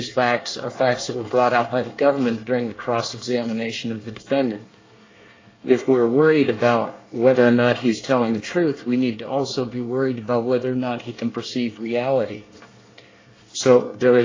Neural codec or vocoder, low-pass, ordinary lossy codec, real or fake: codec, 24 kHz, 1 kbps, SNAC; 7.2 kHz; AAC, 32 kbps; fake